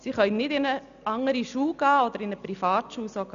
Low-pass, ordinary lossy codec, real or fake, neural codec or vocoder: 7.2 kHz; none; real; none